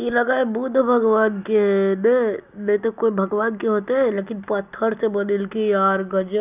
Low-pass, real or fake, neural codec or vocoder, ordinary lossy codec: 3.6 kHz; real; none; none